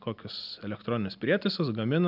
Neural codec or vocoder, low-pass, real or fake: none; 5.4 kHz; real